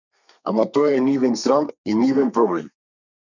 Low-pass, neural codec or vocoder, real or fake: 7.2 kHz; codec, 32 kHz, 1.9 kbps, SNAC; fake